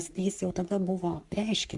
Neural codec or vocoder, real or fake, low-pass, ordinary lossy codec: codec, 44.1 kHz, 3.4 kbps, Pupu-Codec; fake; 10.8 kHz; Opus, 64 kbps